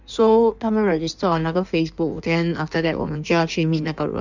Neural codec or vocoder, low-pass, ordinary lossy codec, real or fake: codec, 16 kHz in and 24 kHz out, 1.1 kbps, FireRedTTS-2 codec; 7.2 kHz; none; fake